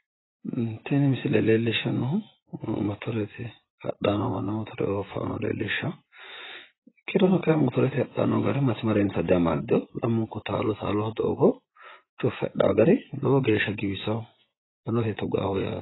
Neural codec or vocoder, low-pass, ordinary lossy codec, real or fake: vocoder, 24 kHz, 100 mel bands, Vocos; 7.2 kHz; AAC, 16 kbps; fake